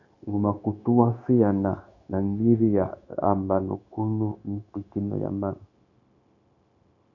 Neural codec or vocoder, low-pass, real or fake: codec, 16 kHz, 0.9 kbps, LongCat-Audio-Codec; 7.2 kHz; fake